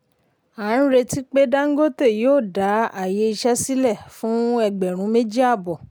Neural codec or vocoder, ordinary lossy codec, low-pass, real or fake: none; none; none; real